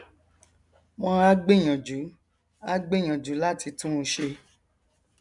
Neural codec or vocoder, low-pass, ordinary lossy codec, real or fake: none; 10.8 kHz; MP3, 96 kbps; real